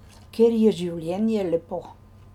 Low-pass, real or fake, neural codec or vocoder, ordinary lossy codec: 19.8 kHz; real; none; none